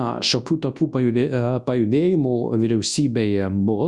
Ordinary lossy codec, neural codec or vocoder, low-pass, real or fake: Opus, 64 kbps; codec, 24 kHz, 0.9 kbps, WavTokenizer, large speech release; 10.8 kHz; fake